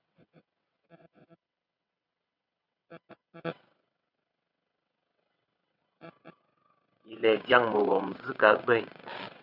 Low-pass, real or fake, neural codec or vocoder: 5.4 kHz; fake; vocoder, 22.05 kHz, 80 mel bands, WaveNeXt